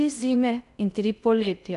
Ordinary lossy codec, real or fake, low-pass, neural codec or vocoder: none; fake; 10.8 kHz; codec, 16 kHz in and 24 kHz out, 0.6 kbps, FocalCodec, streaming, 2048 codes